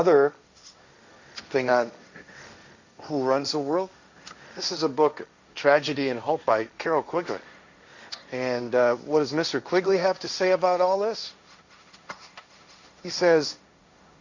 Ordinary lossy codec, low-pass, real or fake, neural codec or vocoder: Opus, 64 kbps; 7.2 kHz; fake; codec, 16 kHz, 1.1 kbps, Voila-Tokenizer